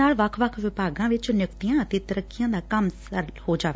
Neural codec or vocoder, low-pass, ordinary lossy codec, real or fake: none; none; none; real